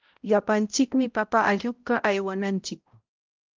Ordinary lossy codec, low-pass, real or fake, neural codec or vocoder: Opus, 32 kbps; 7.2 kHz; fake; codec, 16 kHz, 0.5 kbps, X-Codec, HuBERT features, trained on balanced general audio